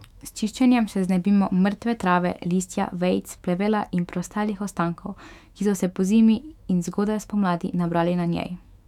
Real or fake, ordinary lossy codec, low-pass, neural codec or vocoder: fake; none; 19.8 kHz; autoencoder, 48 kHz, 128 numbers a frame, DAC-VAE, trained on Japanese speech